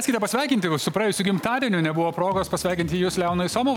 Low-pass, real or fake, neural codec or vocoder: 19.8 kHz; real; none